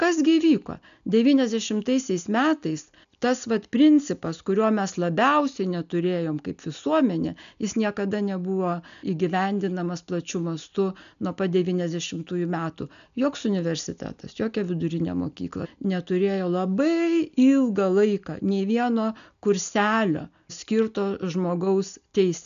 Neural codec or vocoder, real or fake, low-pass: none; real; 7.2 kHz